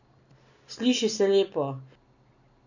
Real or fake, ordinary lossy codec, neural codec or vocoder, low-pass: real; none; none; 7.2 kHz